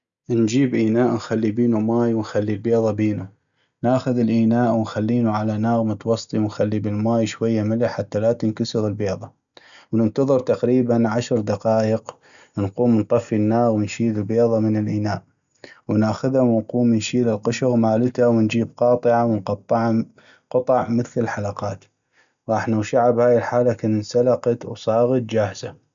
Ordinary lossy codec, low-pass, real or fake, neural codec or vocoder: none; 7.2 kHz; real; none